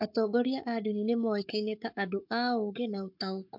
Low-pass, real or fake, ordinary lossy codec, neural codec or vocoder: 5.4 kHz; fake; MP3, 48 kbps; codec, 44.1 kHz, 7.8 kbps, Pupu-Codec